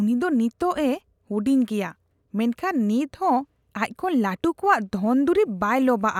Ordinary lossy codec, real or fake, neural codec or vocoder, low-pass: none; real; none; 19.8 kHz